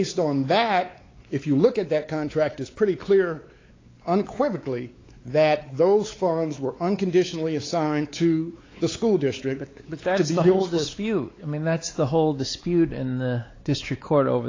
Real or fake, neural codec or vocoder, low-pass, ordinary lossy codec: fake; codec, 16 kHz, 4 kbps, X-Codec, WavLM features, trained on Multilingual LibriSpeech; 7.2 kHz; AAC, 32 kbps